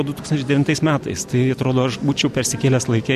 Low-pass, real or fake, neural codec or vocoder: 14.4 kHz; real; none